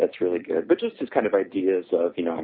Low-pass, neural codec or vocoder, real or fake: 5.4 kHz; none; real